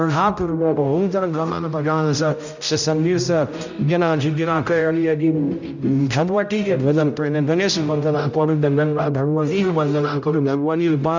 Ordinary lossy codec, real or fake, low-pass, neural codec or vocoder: none; fake; 7.2 kHz; codec, 16 kHz, 0.5 kbps, X-Codec, HuBERT features, trained on general audio